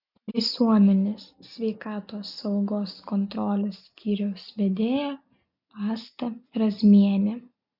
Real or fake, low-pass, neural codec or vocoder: real; 5.4 kHz; none